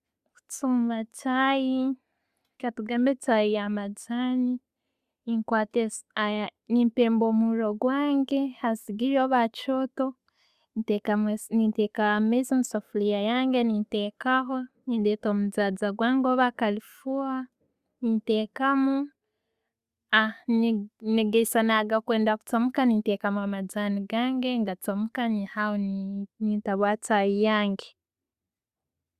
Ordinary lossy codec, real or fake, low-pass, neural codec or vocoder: Opus, 64 kbps; real; 14.4 kHz; none